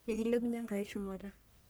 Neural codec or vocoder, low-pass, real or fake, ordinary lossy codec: codec, 44.1 kHz, 1.7 kbps, Pupu-Codec; none; fake; none